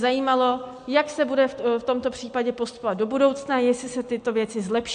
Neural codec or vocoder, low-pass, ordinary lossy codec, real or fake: none; 9.9 kHz; AAC, 64 kbps; real